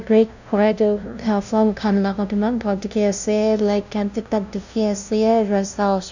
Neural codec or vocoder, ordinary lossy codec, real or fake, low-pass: codec, 16 kHz, 0.5 kbps, FunCodec, trained on LibriTTS, 25 frames a second; none; fake; 7.2 kHz